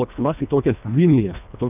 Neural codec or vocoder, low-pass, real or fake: codec, 24 kHz, 1.5 kbps, HILCodec; 3.6 kHz; fake